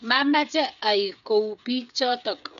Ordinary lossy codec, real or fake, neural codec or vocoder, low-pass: none; fake; codec, 16 kHz, 8 kbps, FreqCodec, smaller model; 7.2 kHz